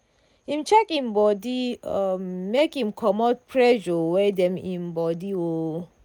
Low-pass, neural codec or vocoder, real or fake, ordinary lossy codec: 19.8 kHz; none; real; none